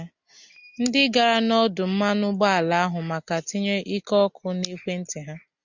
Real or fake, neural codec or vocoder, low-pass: real; none; 7.2 kHz